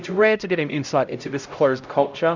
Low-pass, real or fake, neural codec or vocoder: 7.2 kHz; fake; codec, 16 kHz, 0.5 kbps, X-Codec, HuBERT features, trained on LibriSpeech